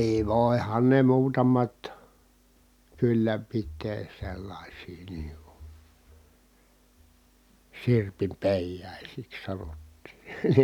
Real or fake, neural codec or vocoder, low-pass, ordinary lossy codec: real; none; 19.8 kHz; none